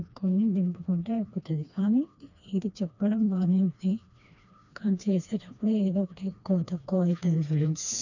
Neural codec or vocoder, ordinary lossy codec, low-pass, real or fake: codec, 16 kHz, 2 kbps, FreqCodec, smaller model; none; 7.2 kHz; fake